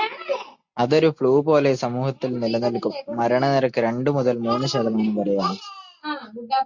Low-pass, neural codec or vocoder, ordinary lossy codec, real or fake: 7.2 kHz; none; MP3, 48 kbps; real